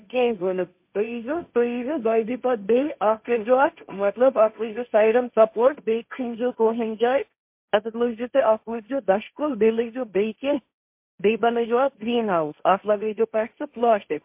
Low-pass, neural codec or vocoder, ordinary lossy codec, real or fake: 3.6 kHz; codec, 16 kHz, 1.1 kbps, Voila-Tokenizer; MP3, 32 kbps; fake